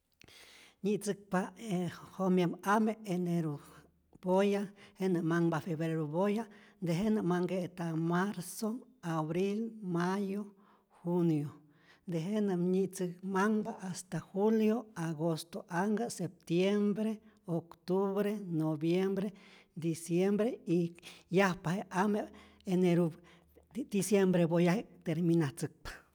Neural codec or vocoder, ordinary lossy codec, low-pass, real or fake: none; none; none; real